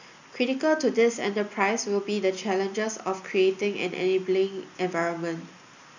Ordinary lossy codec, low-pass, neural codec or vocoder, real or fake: none; 7.2 kHz; none; real